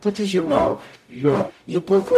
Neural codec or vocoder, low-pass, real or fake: codec, 44.1 kHz, 0.9 kbps, DAC; 14.4 kHz; fake